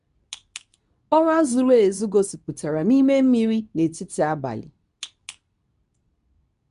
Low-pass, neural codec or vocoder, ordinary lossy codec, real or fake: 10.8 kHz; codec, 24 kHz, 0.9 kbps, WavTokenizer, medium speech release version 2; none; fake